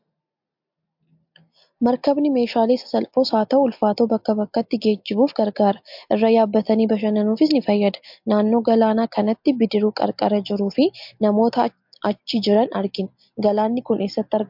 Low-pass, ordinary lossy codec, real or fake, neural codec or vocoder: 5.4 kHz; MP3, 48 kbps; real; none